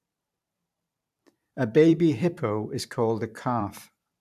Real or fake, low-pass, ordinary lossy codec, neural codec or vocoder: fake; 14.4 kHz; AAC, 96 kbps; vocoder, 44.1 kHz, 128 mel bands every 512 samples, BigVGAN v2